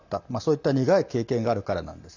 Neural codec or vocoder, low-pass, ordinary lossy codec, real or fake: none; 7.2 kHz; none; real